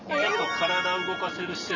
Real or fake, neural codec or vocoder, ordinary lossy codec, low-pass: real; none; none; 7.2 kHz